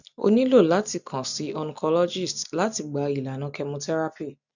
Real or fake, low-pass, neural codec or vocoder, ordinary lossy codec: real; 7.2 kHz; none; none